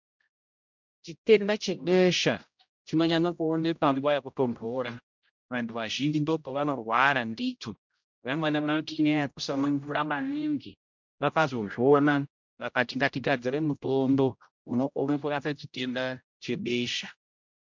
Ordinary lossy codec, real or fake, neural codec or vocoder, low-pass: MP3, 64 kbps; fake; codec, 16 kHz, 0.5 kbps, X-Codec, HuBERT features, trained on general audio; 7.2 kHz